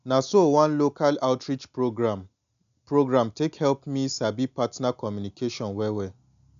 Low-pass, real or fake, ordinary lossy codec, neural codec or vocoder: 7.2 kHz; real; none; none